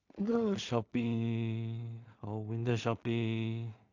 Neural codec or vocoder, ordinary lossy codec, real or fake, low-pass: codec, 16 kHz in and 24 kHz out, 0.4 kbps, LongCat-Audio-Codec, two codebook decoder; AAC, 48 kbps; fake; 7.2 kHz